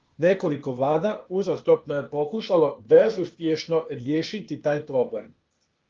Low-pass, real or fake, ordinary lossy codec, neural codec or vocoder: 7.2 kHz; fake; Opus, 32 kbps; codec, 16 kHz, 0.8 kbps, ZipCodec